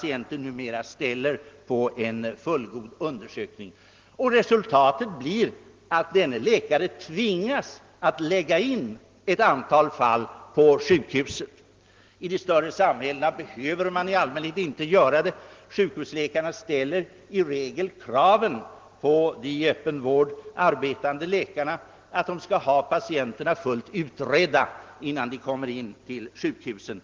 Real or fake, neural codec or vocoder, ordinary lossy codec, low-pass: real; none; Opus, 16 kbps; 7.2 kHz